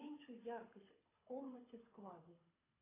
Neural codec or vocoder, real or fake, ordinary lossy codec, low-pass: codec, 24 kHz, 6 kbps, HILCodec; fake; AAC, 16 kbps; 3.6 kHz